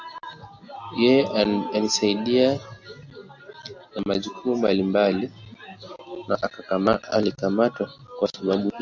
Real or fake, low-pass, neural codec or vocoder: real; 7.2 kHz; none